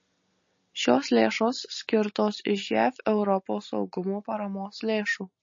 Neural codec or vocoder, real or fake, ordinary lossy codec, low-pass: none; real; MP3, 32 kbps; 7.2 kHz